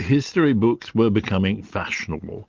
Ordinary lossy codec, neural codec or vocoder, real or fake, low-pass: Opus, 32 kbps; none; real; 7.2 kHz